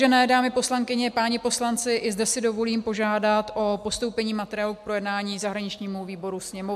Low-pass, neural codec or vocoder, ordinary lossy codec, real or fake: 14.4 kHz; none; AAC, 96 kbps; real